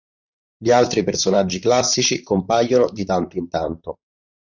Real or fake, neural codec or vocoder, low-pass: fake; vocoder, 44.1 kHz, 128 mel bands, Pupu-Vocoder; 7.2 kHz